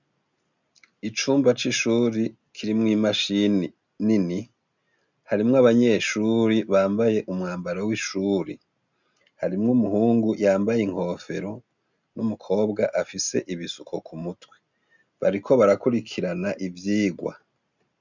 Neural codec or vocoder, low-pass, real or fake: none; 7.2 kHz; real